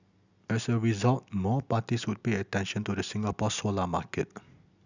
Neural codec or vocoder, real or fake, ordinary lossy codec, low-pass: none; real; none; 7.2 kHz